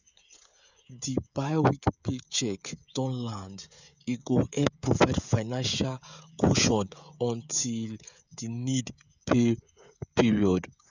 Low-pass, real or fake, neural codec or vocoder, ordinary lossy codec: 7.2 kHz; fake; codec, 16 kHz, 16 kbps, FreqCodec, smaller model; none